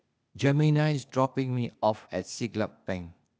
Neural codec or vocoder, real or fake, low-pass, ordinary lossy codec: codec, 16 kHz, 0.8 kbps, ZipCodec; fake; none; none